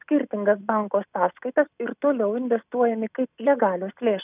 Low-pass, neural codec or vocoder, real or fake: 3.6 kHz; none; real